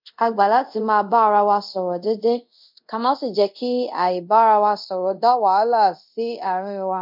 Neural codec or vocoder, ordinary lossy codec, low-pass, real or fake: codec, 24 kHz, 0.5 kbps, DualCodec; MP3, 48 kbps; 5.4 kHz; fake